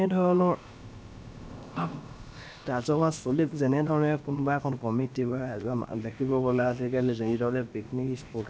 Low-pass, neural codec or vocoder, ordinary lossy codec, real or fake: none; codec, 16 kHz, about 1 kbps, DyCAST, with the encoder's durations; none; fake